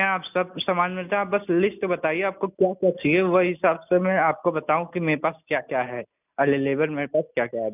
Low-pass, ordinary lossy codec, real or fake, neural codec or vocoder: 3.6 kHz; none; real; none